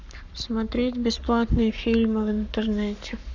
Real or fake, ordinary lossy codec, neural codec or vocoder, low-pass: fake; none; codec, 44.1 kHz, 7.8 kbps, Pupu-Codec; 7.2 kHz